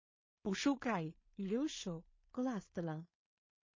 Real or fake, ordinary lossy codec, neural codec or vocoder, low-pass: fake; MP3, 32 kbps; codec, 16 kHz in and 24 kHz out, 0.4 kbps, LongCat-Audio-Codec, two codebook decoder; 7.2 kHz